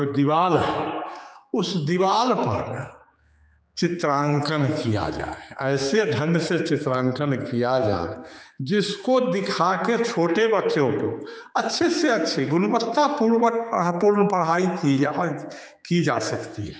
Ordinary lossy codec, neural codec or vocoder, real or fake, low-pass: none; codec, 16 kHz, 4 kbps, X-Codec, HuBERT features, trained on general audio; fake; none